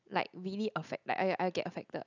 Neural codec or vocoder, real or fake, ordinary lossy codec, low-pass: none; real; none; 7.2 kHz